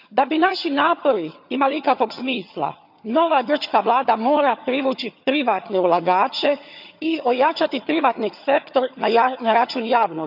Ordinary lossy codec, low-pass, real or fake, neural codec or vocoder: none; 5.4 kHz; fake; vocoder, 22.05 kHz, 80 mel bands, HiFi-GAN